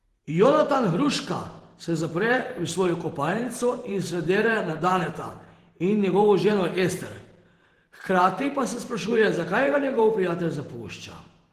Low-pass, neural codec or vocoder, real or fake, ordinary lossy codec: 14.4 kHz; vocoder, 48 kHz, 128 mel bands, Vocos; fake; Opus, 16 kbps